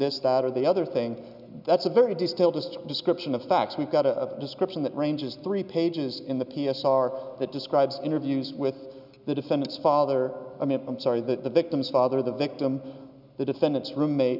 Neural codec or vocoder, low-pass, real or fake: none; 5.4 kHz; real